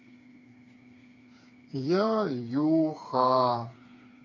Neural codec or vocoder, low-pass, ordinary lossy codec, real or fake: codec, 16 kHz, 4 kbps, FreqCodec, smaller model; 7.2 kHz; AAC, 48 kbps; fake